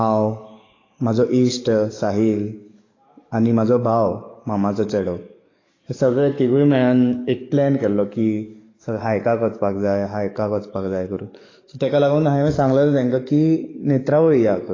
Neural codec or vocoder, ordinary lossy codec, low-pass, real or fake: codec, 44.1 kHz, 7.8 kbps, DAC; AAC, 32 kbps; 7.2 kHz; fake